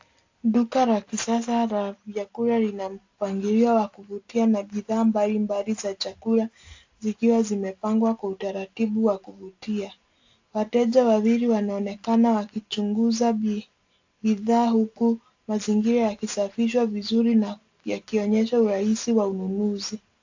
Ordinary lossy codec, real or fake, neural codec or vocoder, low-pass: AAC, 48 kbps; real; none; 7.2 kHz